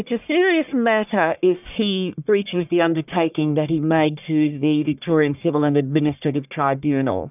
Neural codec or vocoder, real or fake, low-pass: codec, 44.1 kHz, 1.7 kbps, Pupu-Codec; fake; 3.6 kHz